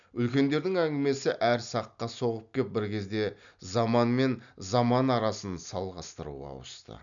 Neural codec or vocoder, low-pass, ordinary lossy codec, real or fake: none; 7.2 kHz; none; real